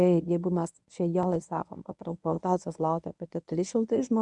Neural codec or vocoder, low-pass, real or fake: codec, 24 kHz, 0.9 kbps, WavTokenizer, medium speech release version 1; 10.8 kHz; fake